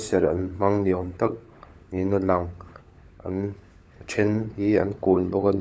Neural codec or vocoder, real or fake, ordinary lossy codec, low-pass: codec, 16 kHz, 16 kbps, FunCodec, trained on LibriTTS, 50 frames a second; fake; none; none